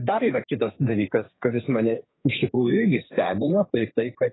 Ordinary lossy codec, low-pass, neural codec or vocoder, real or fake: AAC, 16 kbps; 7.2 kHz; codec, 32 kHz, 1.9 kbps, SNAC; fake